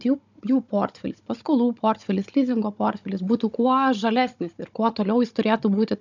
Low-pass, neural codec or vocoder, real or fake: 7.2 kHz; codec, 16 kHz, 16 kbps, FunCodec, trained on Chinese and English, 50 frames a second; fake